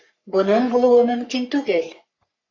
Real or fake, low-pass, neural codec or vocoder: fake; 7.2 kHz; codec, 44.1 kHz, 3.4 kbps, Pupu-Codec